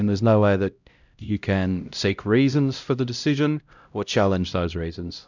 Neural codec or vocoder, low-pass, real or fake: codec, 16 kHz, 0.5 kbps, X-Codec, HuBERT features, trained on LibriSpeech; 7.2 kHz; fake